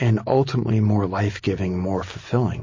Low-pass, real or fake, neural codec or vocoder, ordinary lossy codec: 7.2 kHz; real; none; MP3, 32 kbps